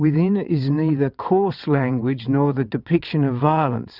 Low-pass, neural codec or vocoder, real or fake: 5.4 kHz; vocoder, 22.05 kHz, 80 mel bands, WaveNeXt; fake